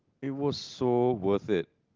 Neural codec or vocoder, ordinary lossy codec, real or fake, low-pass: none; Opus, 32 kbps; real; 7.2 kHz